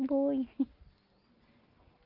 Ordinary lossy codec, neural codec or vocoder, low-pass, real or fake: Opus, 32 kbps; none; 5.4 kHz; real